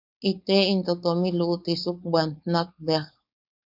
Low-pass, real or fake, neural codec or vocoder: 5.4 kHz; fake; codec, 16 kHz, 4.8 kbps, FACodec